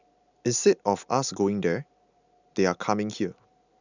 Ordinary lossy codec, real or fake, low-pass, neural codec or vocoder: none; real; 7.2 kHz; none